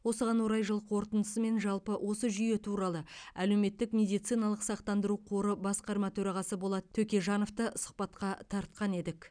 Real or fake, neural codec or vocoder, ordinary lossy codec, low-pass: real; none; none; 9.9 kHz